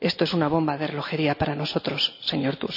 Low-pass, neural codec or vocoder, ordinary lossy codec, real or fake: 5.4 kHz; none; none; real